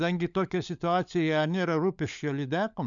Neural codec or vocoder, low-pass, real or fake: codec, 16 kHz, 16 kbps, FunCodec, trained on LibriTTS, 50 frames a second; 7.2 kHz; fake